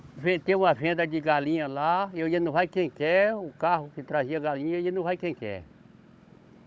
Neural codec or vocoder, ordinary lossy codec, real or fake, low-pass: codec, 16 kHz, 16 kbps, FunCodec, trained on Chinese and English, 50 frames a second; none; fake; none